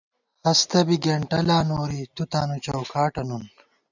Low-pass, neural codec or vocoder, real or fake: 7.2 kHz; none; real